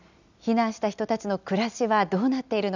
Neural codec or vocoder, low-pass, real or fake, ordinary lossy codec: none; 7.2 kHz; real; none